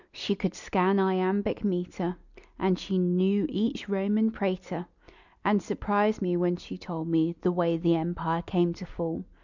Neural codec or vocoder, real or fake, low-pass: none; real; 7.2 kHz